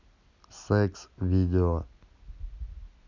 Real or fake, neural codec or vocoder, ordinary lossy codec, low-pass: real; none; none; 7.2 kHz